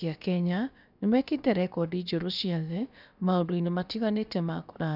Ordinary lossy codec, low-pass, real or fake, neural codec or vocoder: none; 5.4 kHz; fake; codec, 16 kHz, about 1 kbps, DyCAST, with the encoder's durations